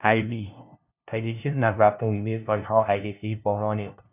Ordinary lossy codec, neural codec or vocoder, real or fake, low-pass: none; codec, 16 kHz, 0.5 kbps, FunCodec, trained on LibriTTS, 25 frames a second; fake; 3.6 kHz